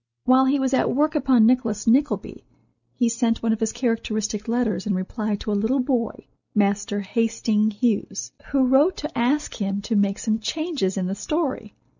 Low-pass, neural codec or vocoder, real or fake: 7.2 kHz; none; real